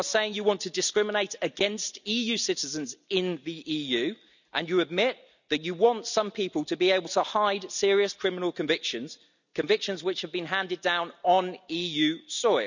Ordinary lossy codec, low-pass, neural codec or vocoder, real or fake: none; 7.2 kHz; none; real